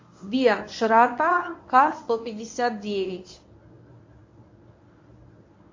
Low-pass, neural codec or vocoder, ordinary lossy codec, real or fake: 7.2 kHz; codec, 24 kHz, 0.9 kbps, WavTokenizer, medium speech release version 1; MP3, 48 kbps; fake